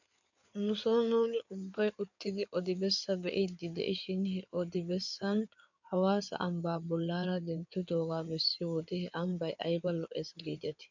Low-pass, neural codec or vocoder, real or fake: 7.2 kHz; codec, 16 kHz in and 24 kHz out, 2.2 kbps, FireRedTTS-2 codec; fake